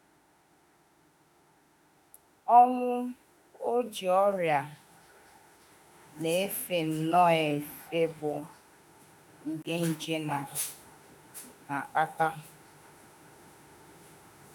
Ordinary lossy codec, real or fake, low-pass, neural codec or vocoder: none; fake; none; autoencoder, 48 kHz, 32 numbers a frame, DAC-VAE, trained on Japanese speech